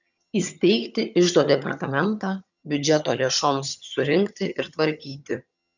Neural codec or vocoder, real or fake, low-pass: vocoder, 22.05 kHz, 80 mel bands, HiFi-GAN; fake; 7.2 kHz